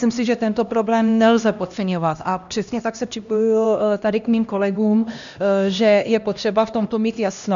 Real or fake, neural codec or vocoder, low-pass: fake; codec, 16 kHz, 1 kbps, X-Codec, HuBERT features, trained on LibriSpeech; 7.2 kHz